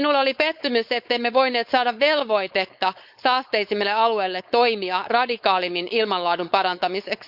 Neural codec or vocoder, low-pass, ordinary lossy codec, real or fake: codec, 16 kHz, 4.8 kbps, FACodec; 5.4 kHz; Opus, 64 kbps; fake